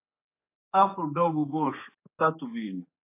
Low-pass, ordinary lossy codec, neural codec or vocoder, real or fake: 3.6 kHz; AAC, 24 kbps; codec, 16 kHz, 4 kbps, X-Codec, HuBERT features, trained on general audio; fake